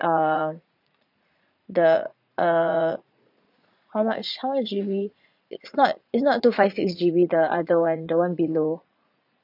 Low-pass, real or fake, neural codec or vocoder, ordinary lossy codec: 5.4 kHz; fake; vocoder, 44.1 kHz, 80 mel bands, Vocos; none